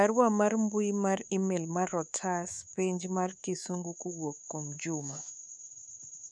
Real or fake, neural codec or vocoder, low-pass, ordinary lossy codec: fake; codec, 24 kHz, 3.1 kbps, DualCodec; none; none